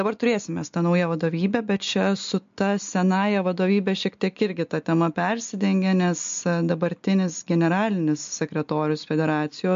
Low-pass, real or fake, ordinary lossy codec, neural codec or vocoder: 7.2 kHz; real; MP3, 48 kbps; none